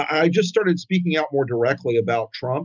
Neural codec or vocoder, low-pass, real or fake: none; 7.2 kHz; real